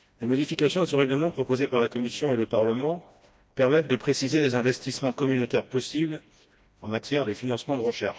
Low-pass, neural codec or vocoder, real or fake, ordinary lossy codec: none; codec, 16 kHz, 1 kbps, FreqCodec, smaller model; fake; none